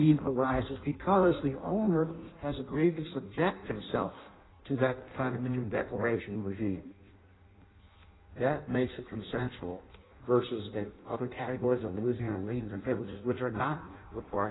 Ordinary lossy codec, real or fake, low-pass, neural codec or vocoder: AAC, 16 kbps; fake; 7.2 kHz; codec, 16 kHz in and 24 kHz out, 0.6 kbps, FireRedTTS-2 codec